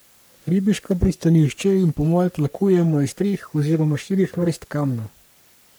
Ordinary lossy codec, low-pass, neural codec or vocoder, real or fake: none; none; codec, 44.1 kHz, 3.4 kbps, Pupu-Codec; fake